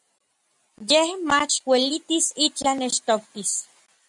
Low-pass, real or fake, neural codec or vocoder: 10.8 kHz; real; none